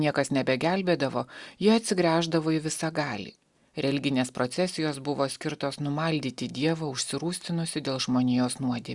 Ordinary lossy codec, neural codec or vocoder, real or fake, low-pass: Opus, 64 kbps; none; real; 10.8 kHz